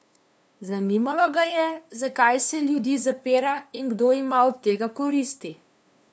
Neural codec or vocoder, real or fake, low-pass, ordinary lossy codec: codec, 16 kHz, 2 kbps, FunCodec, trained on LibriTTS, 25 frames a second; fake; none; none